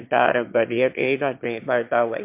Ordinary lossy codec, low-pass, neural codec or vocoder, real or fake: MP3, 32 kbps; 3.6 kHz; autoencoder, 22.05 kHz, a latent of 192 numbers a frame, VITS, trained on one speaker; fake